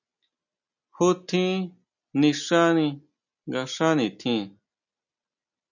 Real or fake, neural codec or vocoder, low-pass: real; none; 7.2 kHz